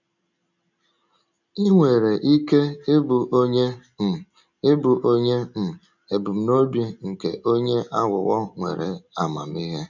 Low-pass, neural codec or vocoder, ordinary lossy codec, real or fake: 7.2 kHz; none; none; real